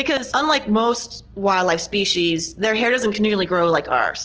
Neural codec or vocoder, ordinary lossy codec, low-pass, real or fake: none; Opus, 16 kbps; 7.2 kHz; real